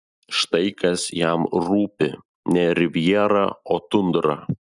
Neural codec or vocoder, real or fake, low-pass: none; real; 10.8 kHz